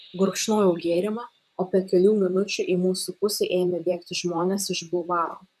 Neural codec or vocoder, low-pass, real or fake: vocoder, 44.1 kHz, 128 mel bands, Pupu-Vocoder; 14.4 kHz; fake